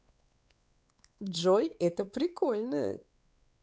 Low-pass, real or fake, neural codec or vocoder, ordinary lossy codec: none; fake; codec, 16 kHz, 4 kbps, X-Codec, WavLM features, trained on Multilingual LibriSpeech; none